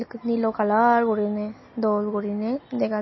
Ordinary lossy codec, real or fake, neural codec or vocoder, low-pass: MP3, 24 kbps; real; none; 7.2 kHz